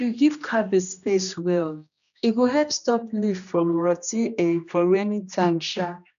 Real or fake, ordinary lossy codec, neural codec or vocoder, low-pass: fake; none; codec, 16 kHz, 1 kbps, X-Codec, HuBERT features, trained on general audio; 7.2 kHz